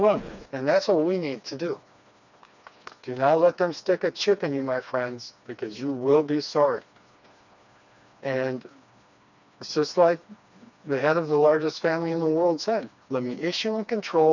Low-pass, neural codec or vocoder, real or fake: 7.2 kHz; codec, 16 kHz, 2 kbps, FreqCodec, smaller model; fake